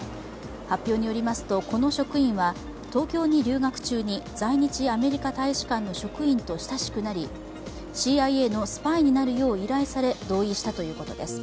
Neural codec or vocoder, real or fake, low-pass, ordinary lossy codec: none; real; none; none